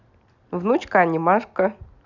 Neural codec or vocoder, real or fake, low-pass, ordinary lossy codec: none; real; 7.2 kHz; none